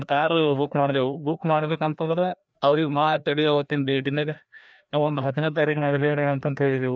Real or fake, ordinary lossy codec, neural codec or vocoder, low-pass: fake; none; codec, 16 kHz, 1 kbps, FreqCodec, larger model; none